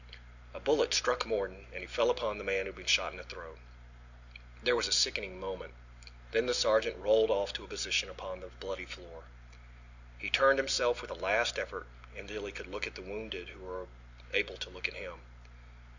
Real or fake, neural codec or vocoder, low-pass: real; none; 7.2 kHz